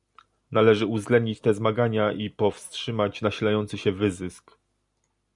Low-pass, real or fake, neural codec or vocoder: 10.8 kHz; real; none